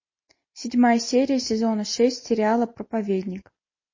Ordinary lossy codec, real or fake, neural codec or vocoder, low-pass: MP3, 32 kbps; real; none; 7.2 kHz